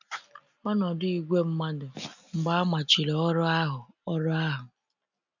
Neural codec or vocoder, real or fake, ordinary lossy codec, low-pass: none; real; none; 7.2 kHz